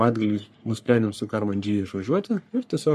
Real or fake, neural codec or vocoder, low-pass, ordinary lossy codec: fake; codec, 44.1 kHz, 3.4 kbps, Pupu-Codec; 14.4 kHz; MP3, 64 kbps